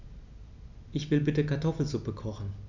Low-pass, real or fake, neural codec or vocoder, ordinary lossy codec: 7.2 kHz; real; none; none